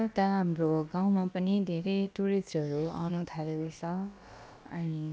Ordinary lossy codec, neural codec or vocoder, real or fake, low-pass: none; codec, 16 kHz, about 1 kbps, DyCAST, with the encoder's durations; fake; none